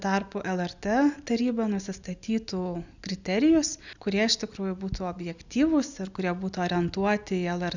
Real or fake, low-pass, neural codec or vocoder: real; 7.2 kHz; none